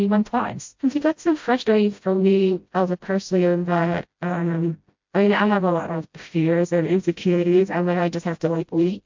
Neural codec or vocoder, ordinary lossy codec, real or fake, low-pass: codec, 16 kHz, 0.5 kbps, FreqCodec, smaller model; MP3, 48 kbps; fake; 7.2 kHz